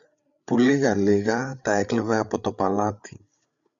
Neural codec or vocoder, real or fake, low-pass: codec, 16 kHz, 8 kbps, FreqCodec, larger model; fake; 7.2 kHz